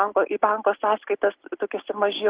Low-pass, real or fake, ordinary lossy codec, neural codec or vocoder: 3.6 kHz; real; Opus, 16 kbps; none